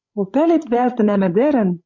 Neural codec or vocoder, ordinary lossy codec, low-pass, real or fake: codec, 16 kHz, 16 kbps, FreqCodec, larger model; MP3, 64 kbps; 7.2 kHz; fake